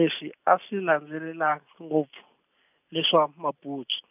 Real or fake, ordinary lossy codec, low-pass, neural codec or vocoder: real; none; 3.6 kHz; none